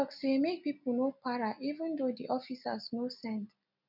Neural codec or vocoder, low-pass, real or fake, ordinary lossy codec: none; 5.4 kHz; real; none